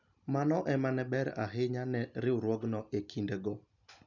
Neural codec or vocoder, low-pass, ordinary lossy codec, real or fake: none; 7.2 kHz; none; real